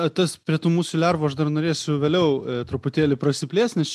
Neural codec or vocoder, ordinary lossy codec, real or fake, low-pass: none; MP3, 96 kbps; real; 14.4 kHz